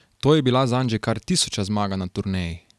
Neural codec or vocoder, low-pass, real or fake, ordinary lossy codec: none; none; real; none